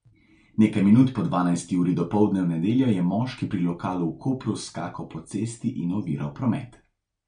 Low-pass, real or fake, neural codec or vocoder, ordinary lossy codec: 9.9 kHz; real; none; MP3, 64 kbps